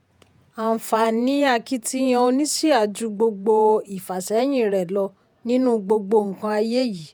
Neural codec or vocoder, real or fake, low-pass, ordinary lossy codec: vocoder, 48 kHz, 128 mel bands, Vocos; fake; none; none